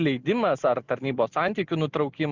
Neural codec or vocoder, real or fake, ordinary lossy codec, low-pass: none; real; Opus, 64 kbps; 7.2 kHz